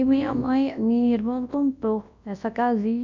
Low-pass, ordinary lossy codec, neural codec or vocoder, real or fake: 7.2 kHz; MP3, 64 kbps; codec, 24 kHz, 0.9 kbps, WavTokenizer, large speech release; fake